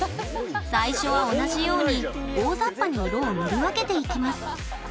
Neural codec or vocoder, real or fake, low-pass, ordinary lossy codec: none; real; none; none